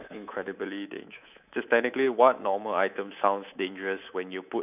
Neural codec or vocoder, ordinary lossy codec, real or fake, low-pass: codec, 24 kHz, 3.1 kbps, DualCodec; none; fake; 3.6 kHz